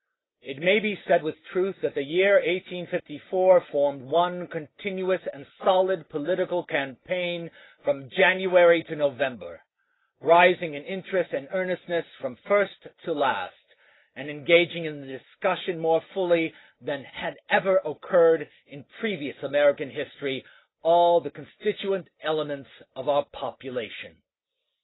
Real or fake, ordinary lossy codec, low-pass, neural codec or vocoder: real; AAC, 16 kbps; 7.2 kHz; none